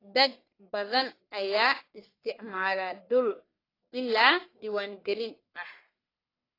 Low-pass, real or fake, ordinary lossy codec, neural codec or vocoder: 5.4 kHz; fake; AAC, 24 kbps; codec, 44.1 kHz, 1.7 kbps, Pupu-Codec